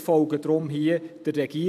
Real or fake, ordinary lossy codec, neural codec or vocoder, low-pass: real; none; none; 14.4 kHz